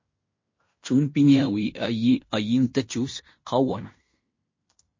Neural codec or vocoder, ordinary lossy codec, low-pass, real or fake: codec, 16 kHz in and 24 kHz out, 0.9 kbps, LongCat-Audio-Codec, fine tuned four codebook decoder; MP3, 32 kbps; 7.2 kHz; fake